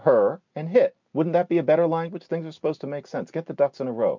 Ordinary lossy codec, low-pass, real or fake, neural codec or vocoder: MP3, 64 kbps; 7.2 kHz; real; none